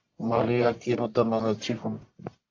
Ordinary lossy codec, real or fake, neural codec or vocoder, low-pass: AAC, 48 kbps; fake; codec, 44.1 kHz, 1.7 kbps, Pupu-Codec; 7.2 kHz